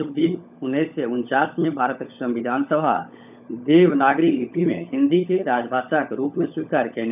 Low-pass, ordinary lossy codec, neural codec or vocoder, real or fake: 3.6 kHz; none; codec, 16 kHz, 16 kbps, FunCodec, trained on LibriTTS, 50 frames a second; fake